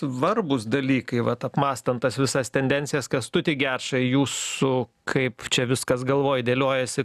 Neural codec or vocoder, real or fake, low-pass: none; real; 14.4 kHz